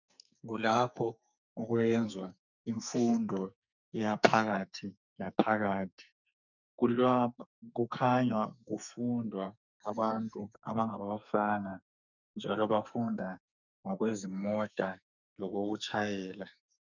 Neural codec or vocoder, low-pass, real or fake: codec, 44.1 kHz, 2.6 kbps, SNAC; 7.2 kHz; fake